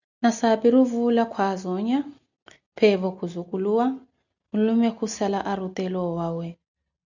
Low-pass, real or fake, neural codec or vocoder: 7.2 kHz; real; none